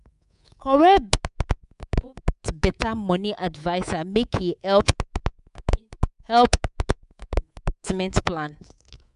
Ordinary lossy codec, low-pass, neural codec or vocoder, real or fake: none; 10.8 kHz; codec, 24 kHz, 3.1 kbps, DualCodec; fake